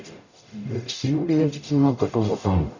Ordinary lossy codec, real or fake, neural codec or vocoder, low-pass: none; fake; codec, 44.1 kHz, 0.9 kbps, DAC; 7.2 kHz